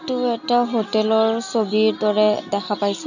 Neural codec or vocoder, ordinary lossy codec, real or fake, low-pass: none; none; real; 7.2 kHz